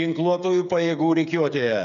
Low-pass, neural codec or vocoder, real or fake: 7.2 kHz; codec, 16 kHz, 8 kbps, FreqCodec, smaller model; fake